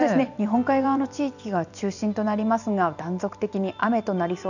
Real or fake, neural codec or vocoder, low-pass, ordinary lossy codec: fake; vocoder, 44.1 kHz, 128 mel bands every 256 samples, BigVGAN v2; 7.2 kHz; none